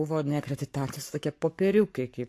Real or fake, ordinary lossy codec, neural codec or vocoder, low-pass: fake; AAC, 64 kbps; codec, 44.1 kHz, 7.8 kbps, Pupu-Codec; 14.4 kHz